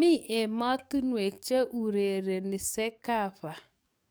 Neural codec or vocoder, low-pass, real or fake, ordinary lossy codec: codec, 44.1 kHz, 7.8 kbps, DAC; none; fake; none